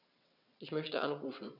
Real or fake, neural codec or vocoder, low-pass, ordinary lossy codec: fake; vocoder, 22.05 kHz, 80 mel bands, WaveNeXt; 5.4 kHz; none